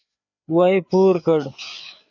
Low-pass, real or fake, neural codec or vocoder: 7.2 kHz; fake; codec, 16 kHz, 4 kbps, FreqCodec, larger model